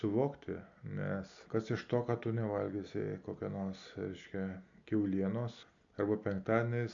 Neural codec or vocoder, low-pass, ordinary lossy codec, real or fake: none; 7.2 kHz; AAC, 64 kbps; real